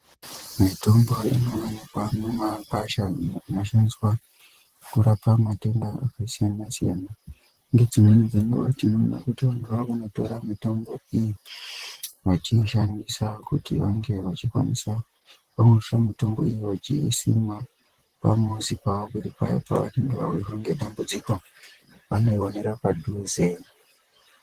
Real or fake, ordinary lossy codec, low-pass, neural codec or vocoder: fake; Opus, 16 kbps; 14.4 kHz; vocoder, 44.1 kHz, 128 mel bands, Pupu-Vocoder